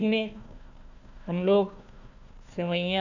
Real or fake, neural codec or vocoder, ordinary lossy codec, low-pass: fake; codec, 16 kHz, 1 kbps, FunCodec, trained on Chinese and English, 50 frames a second; none; 7.2 kHz